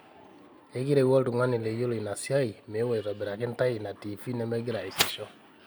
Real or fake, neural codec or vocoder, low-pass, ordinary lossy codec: real; none; none; none